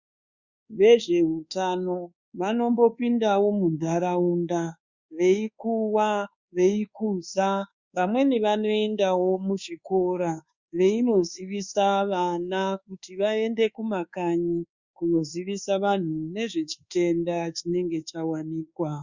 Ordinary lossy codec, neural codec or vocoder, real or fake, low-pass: Opus, 64 kbps; codec, 24 kHz, 1.2 kbps, DualCodec; fake; 7.2 kHz